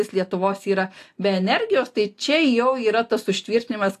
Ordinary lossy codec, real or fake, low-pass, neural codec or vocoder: AAC, 64 kbps; real; 14.4 kHz; none